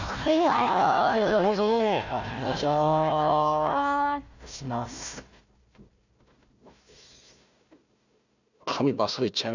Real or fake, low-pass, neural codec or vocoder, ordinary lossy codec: fake; 7.2 kHz; codec, 16 kHz, 1 kbps, FunCodec, trained on Chinese and English, 50 frames a second; none